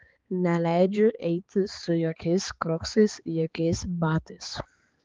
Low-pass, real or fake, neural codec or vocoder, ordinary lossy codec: 7.2 kHz; fake; codec, 16 kHz, 4 kbps, X-Codec, HuBERT features, trained on balanced general audio; Opus, 32 kbps